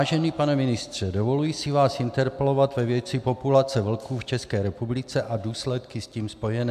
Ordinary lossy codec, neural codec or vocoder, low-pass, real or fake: MP3, 96 kbps; none; 14.4 kHz; real